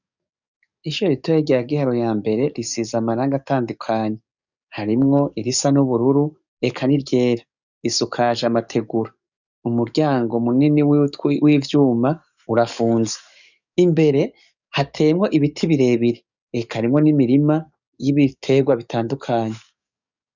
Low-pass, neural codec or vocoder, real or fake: 7.2 kHz; codec, 44.1 kHz, 7.8 kbps, DAC; fake